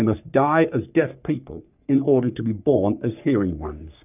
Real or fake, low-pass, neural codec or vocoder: fake; 3.6 kHz; codec, 44.1 kHz, 3.4 kbps, Pupu-Codec